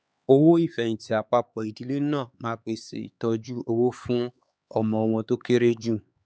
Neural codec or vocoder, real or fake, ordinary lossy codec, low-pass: codec, 16 kHz, 4 kbps, X-Codec, HuBERT features, trained on LibriSpeech; fake; none; none